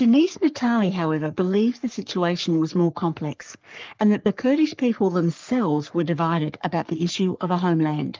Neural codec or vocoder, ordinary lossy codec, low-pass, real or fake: codec, 44.1 kHz, 3.4 kbps, Pupu-Codec; Opus, 24 kbps; 7.2 kHz; fake